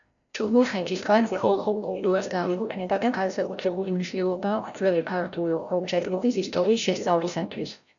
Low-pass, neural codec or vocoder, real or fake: 7.2 kHz; codec, 16 kHz, 0.5 kbps, FreqCodec, larger model; fake